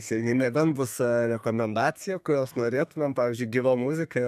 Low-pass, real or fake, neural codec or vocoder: 14.4 kHz; fake; codec, 32 kHz, 1.9 kbps, SNAC